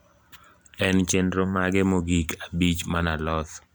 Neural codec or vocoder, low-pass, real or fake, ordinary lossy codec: vocoder, 44.1 kHz, 128 mel bands every 256 samples, BigVGAN v2; none; fake; none